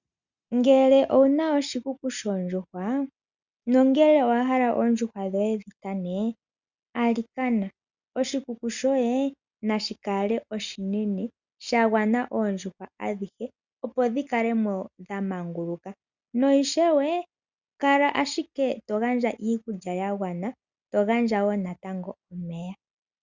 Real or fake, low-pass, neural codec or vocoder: real; 7.2 kHz; none